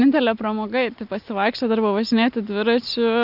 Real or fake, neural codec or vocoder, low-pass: real; none; 5.4 kHz